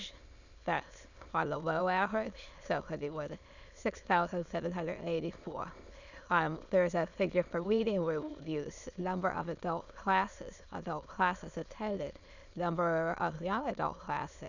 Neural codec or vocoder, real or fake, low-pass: autoencoder, 22.05 kHz, a latent of 192 numbers a frame, VITS, trained on many speakers; fake; 7.2 kHz